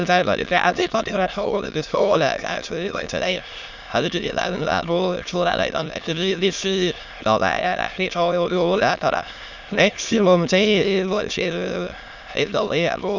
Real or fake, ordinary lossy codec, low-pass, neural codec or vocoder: fake; Opus, 64 kbps; 7.2 kHz; autoencoder, 22.05 kHz, a latent of 192 numbers a frame, VITS, trained on many speakers